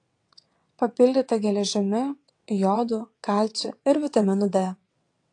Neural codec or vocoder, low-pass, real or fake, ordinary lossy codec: vocoder, 22.05 kHz, 80 mel bands, WaveNeXt; 9.9 kHz; fake; AAC, 48 kbps